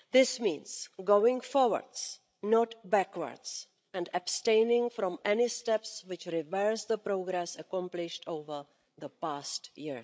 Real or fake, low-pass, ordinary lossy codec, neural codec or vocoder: fake; none; none; codec, 16 kHz, 16 kbps, FreqCodec, larger model